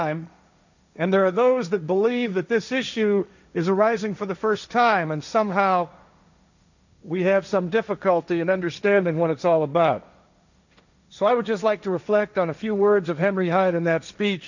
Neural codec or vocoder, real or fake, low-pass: codec, 16 kHz, 1.1 kbps, Voila-Tokenizer; fake; 7.2 kHz